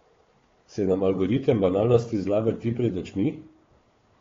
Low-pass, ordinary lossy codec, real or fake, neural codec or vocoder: 7.2 kHz; AAC, 32 kbps; fake; codec, 16 kHz, 4 kbps, FunCodec, trained on Chinese and English, 50 frames a second